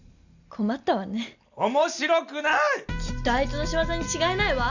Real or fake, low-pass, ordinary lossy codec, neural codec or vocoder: real; 7.2 kHz; none; none